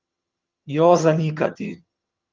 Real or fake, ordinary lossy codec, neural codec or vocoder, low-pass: fake; Opus, 32 kbps; vocoder, 22.05 kHz, 80 mel bands, HiFi-GAN; 7.2 kHz